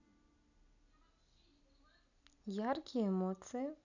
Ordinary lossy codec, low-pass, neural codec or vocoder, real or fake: none; 7.2 kHz; none; real